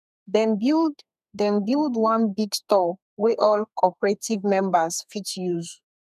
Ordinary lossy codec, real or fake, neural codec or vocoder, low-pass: none; fake; codec, 32 kHz, 1.9 kbps, SNAC; 14.4 kHz